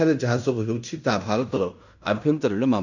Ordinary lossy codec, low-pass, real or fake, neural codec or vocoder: none; 7.2 kHz; fake; codec, 16 kHz in and 24 kHz out, 0.9 kbps, LongCat-Audio-Codec, fine tuned four codebook decoder